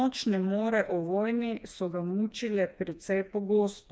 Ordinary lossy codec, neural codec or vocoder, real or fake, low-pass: none; codec, 16 kHz, 2 kbps, FreqCodec, smaller model; fake; none